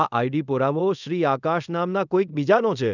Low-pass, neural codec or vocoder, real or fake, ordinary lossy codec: 7.2 kHz; codec, 24 kHz, 0.5 kbps, DualCodec; fake; none